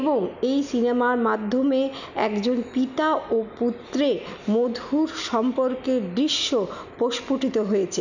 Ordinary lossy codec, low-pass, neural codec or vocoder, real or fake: none; 7.2 kHz; none; real